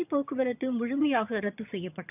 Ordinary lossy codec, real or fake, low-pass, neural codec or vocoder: none; fake; 3.6 kHz; vocoder, 22.05 kHz, 80 mel bands, HiFi-GAN